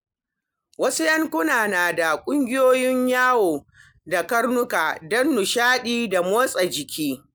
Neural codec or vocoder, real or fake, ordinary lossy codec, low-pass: none; real; none; none